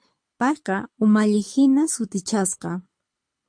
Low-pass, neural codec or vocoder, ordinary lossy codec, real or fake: 9.9 kHz; codec, 24 kHz, 6 kbps, HILCodec; MP3, 48 kbps; fake